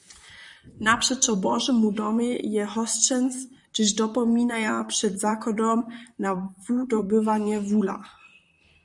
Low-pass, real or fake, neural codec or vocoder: 10.8 kHz; fake; vocoder, 44.1 kHz, 128 mel bands, Pupu-Vocoder